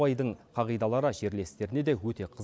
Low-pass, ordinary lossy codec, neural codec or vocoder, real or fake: none; none; none; real